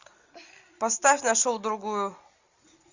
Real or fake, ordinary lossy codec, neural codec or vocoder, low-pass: real; Opus, 64 kbps; none; 7.2 kHz